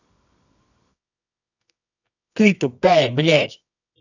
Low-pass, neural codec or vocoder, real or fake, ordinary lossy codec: 7.2 kHz; codec, 24 kHz, 0.9 kbps, WavTokenizer, medium music audio release; fake; none